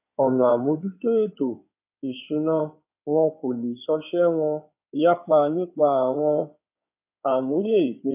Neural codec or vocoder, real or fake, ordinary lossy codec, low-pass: codec, 16 kHz in and 24 kHz out, 2.2 kbps, FireRedTTS-2 codec; fake; AAC, 32 kbps; 3.6 kHz